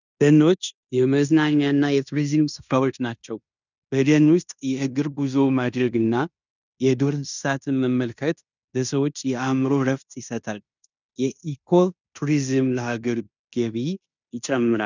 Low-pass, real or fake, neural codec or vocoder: 7.2 kHz; fake; codec, 16 kHz in and 24 kHz out, 0.9 kbps, LongCat-Audio-Codec, fine tuned four codebook decoder